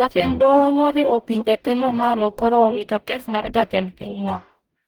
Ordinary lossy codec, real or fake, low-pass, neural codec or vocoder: Opus, 24 kbps; fake; 19.8 kHz; codec, 44.1 kHz, 0.9 kbps, DAC